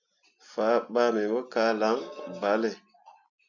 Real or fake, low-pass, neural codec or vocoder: real; 7.2 kHz; none